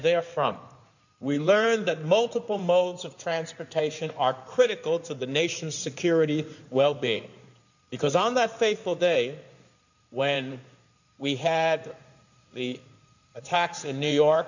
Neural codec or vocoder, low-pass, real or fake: codec, 16 kHz in and 24 kHz out, 2.2 kbps, FireRedTTS-2 codec; 7.2 kHz; fake